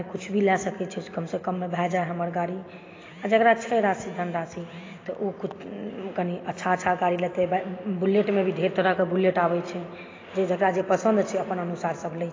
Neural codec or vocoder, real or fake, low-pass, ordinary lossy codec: none; real; 7.2 kHz; AAC, 32 kbps